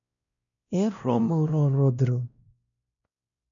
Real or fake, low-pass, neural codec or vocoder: fake; 7.2 kHz; codec, 16 kHz, 1 kbps, X-Codec, WavLM features, trained on Multilingual LibriSpeech